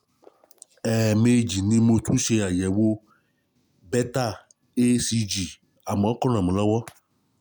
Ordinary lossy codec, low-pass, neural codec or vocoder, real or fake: none; none; none; real